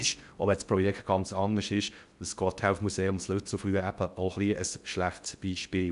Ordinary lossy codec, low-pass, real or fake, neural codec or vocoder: none; 10.8 kHz; fake; codec, 16 kHz in and 24 kHz out, 0.6 kbps, FocalCodec, streaming, 4096 codes